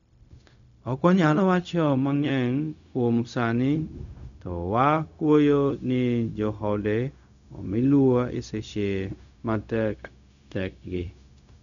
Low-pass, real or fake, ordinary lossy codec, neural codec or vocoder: 7.2 kHz; fake; none; codec, 16 kHz, 0.4 kbps, LongCat-Audio-Codec